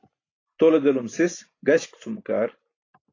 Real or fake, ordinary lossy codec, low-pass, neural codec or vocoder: real; AAC, 32 kbps; 7.2 kHz; none